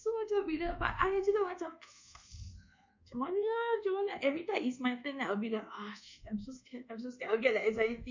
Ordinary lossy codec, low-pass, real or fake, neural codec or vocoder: MP3, 64 kbps; 7.2 kHz; fake; codec, 24 kHz, 1.2 kbps, DualCodec